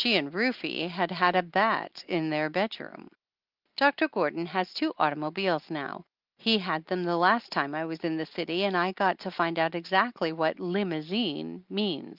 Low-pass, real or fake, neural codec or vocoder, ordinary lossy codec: 5.4 kHz; real; none; Opus, 24 kbps